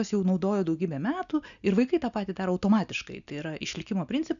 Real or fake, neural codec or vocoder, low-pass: real; none; 7.2 kHz